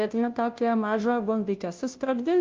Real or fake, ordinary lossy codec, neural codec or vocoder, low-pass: fake; Opus, 32 kbps; codec, 16 kHz, 0.5 kbps, FunCodec, trained on Chinese and English, 25 frames a second; 7.2 kHz